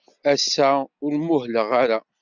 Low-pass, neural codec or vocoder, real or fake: 7.2 kHz; none; real